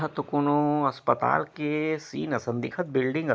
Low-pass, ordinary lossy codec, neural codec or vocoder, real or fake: none; none; none; real